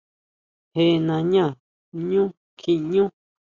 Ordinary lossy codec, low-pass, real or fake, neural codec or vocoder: Opus, 64 kbps; 7.2 kHz; real; none